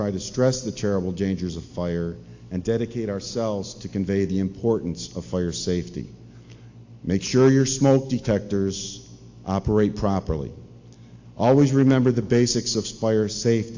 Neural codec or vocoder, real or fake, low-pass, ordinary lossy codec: none; real; 7.2 kHz; AAC, 48 kbps